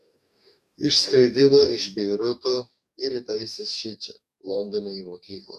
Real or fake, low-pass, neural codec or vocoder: fake; 14.4 kHz; codec, 44.1 kHz, 2.6 kbps, DAC